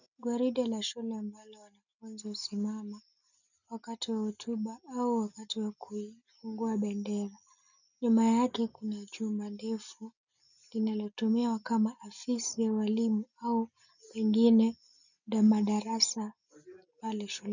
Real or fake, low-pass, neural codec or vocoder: real; 7.2 kHz; none